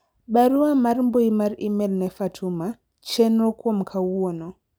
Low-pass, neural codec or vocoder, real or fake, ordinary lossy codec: none; none; real; none